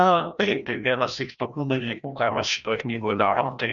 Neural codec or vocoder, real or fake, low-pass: codec, 16 kHz, 1 kbps, FreqCodec, larger model; fake; 7.2 kHz